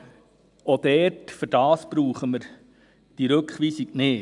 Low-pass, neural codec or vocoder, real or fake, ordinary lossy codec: 10.8 kHz; none; real; none